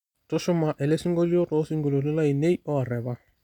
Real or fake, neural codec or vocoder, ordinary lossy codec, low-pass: real; none; none; 19.8 kHz